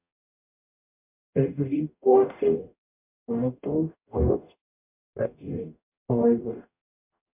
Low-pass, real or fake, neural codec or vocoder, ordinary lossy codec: 3.6 kHz; fake; codec, 44.1 kHz, 0.9 kbps, DAC; MP3, 32 kbps